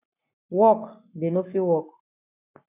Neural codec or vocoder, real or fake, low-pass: codec, 44.1 kHz, 7.8 kbps, Pupu-Codec; fake; 3.6 kHz